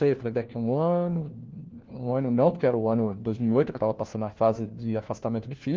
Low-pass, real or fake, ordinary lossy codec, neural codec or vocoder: 7.2 kHz; fake; Opus, 16 kbps; codec, 16 kHz, 1 kbps, FunCodec, trained on LibriTTS, 50 frames a second